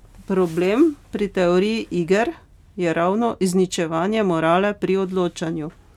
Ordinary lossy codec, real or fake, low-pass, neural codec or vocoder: none; real; 19.8 kHz; none